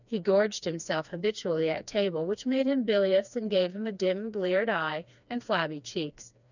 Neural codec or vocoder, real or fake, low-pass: codec, 16 kHz, 2 kbps, FreqCodec, smaller model; fake; 7.2 kHz